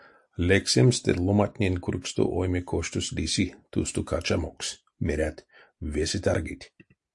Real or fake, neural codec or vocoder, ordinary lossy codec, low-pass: real; none; AAC, 64 kbps; 10.8 kHz